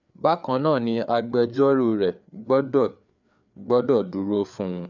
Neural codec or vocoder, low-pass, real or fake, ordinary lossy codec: codec, 16 kHz, 4 kbps, FreqCodec, larger model; 7.2 kHz; fake; none